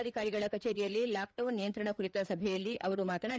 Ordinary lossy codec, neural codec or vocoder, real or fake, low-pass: none; codec, 16 kHz, 4 kbps, FreqCodec, larger model; fake; none